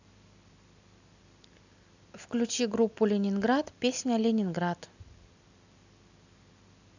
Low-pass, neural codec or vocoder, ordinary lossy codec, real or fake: 7.2 kHz; none; none; real